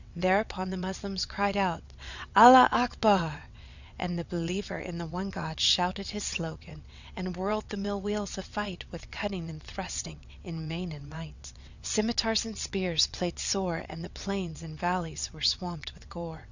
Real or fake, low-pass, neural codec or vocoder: fake; 7.2 kHz; vocoder, 22.05 kHz, 80 mel bands, WaveNeXt